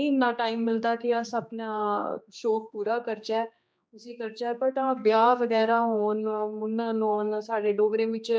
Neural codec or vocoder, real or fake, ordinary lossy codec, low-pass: codec, 16 kHz, 2 kbps, X-Codec, HuBERT features, trained on general audio; fake; none; none